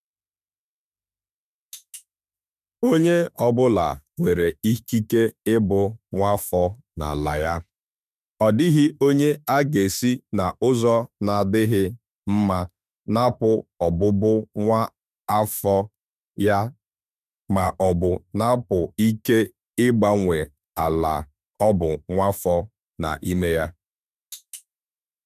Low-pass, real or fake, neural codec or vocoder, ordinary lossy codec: 14.4 kHz; fake; autoencoder, 48 kHz, 32 numbers a frame, DAC-VAE, trained on Japanese speech; none